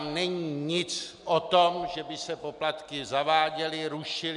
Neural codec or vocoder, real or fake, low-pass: none; real; 10.8 kHz